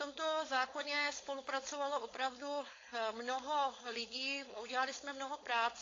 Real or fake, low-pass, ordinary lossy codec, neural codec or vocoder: fake; 7.2 kHz; AAC, 32 kbps; codec, 16 kHz, 4.8 kbps, FACodec